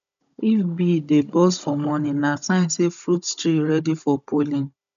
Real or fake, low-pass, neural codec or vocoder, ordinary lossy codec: fake; 7.2 kHz; codec, 16 kHz, 16 kbps, FunCodec, trained on Chinese and English, 50 frames a second; none